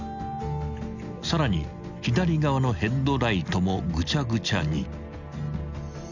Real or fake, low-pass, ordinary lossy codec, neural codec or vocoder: real; 7.2 kHz; none; none